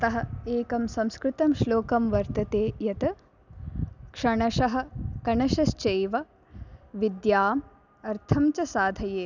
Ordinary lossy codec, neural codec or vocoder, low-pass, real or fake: none; none; 7.2 kHz; real